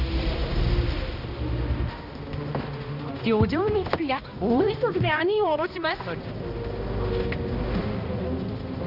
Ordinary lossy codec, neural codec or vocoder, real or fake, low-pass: Opus, 64 kbps; codec, 16 kHz, 1 kbps, X-Codec, HuBERT features, trained on balanced general audio; fake; 5.4 kHz